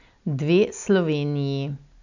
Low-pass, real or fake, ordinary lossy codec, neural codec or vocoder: 7.2 kHz; real; none; none